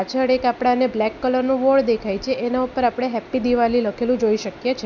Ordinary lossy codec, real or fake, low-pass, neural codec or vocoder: none; real; 7.2 kHz; none